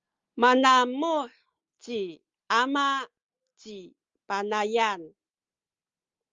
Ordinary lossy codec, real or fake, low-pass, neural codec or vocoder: Opus, 32 kbps; real; 7.2 kHz; none